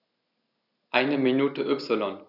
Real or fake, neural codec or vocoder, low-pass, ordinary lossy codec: real; none; 5.4 kHz; none